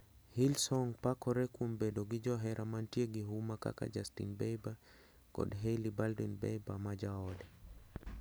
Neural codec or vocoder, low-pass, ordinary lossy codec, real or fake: none; none; none; real